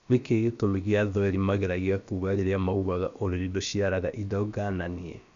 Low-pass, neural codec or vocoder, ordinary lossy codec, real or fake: 7.2 kHz; codec, 16 kHz, about 1 kbps, DyCAST, with the encoder's durations; Opus, 64 kbps; fake